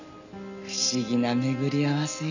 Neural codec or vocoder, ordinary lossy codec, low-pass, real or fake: none; none; 7.2 kHz; real